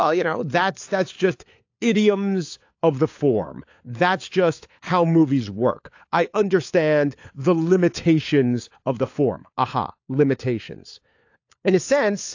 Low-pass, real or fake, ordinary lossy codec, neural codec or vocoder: 7.2 kHz; fake; AAC, 48 kbps; codec, 16 kHz, 4 kbps, FunCodec, trained on LibriTTS, 50 frames a second